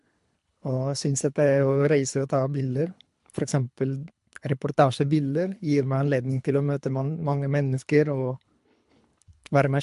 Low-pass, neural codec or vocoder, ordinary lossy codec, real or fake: 10.8 kHz; codec, 24 kHz, 3 kbps, HILCodec; MP3, 64 kbps; fake